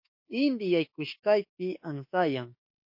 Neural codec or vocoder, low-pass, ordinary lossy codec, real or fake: autoencoder, 48 kHz, 32 numbers a frame, DAC-VAE, trained on Japanese speech; 5.4 kHz; MP3, 32 kbps; fake